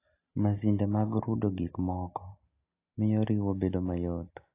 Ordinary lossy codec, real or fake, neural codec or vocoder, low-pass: AAC, 24 kbps; real; none; 3.6 kHz